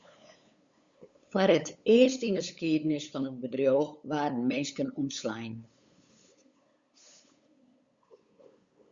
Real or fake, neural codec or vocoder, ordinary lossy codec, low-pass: fake; codec, 16 kHz, 8 kbps, FunCodec, trained on LibriTTS, 25 frames a second; Opus, 64 kbps; 7.2 kHz